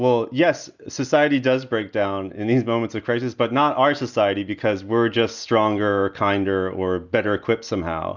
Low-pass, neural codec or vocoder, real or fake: 7.2 kHz; none; real